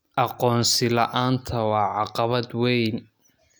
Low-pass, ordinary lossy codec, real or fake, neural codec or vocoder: none; none; real; none